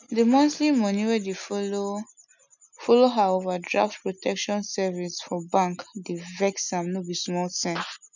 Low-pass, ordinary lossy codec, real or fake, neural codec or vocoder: 7.2 kHz; none; real; none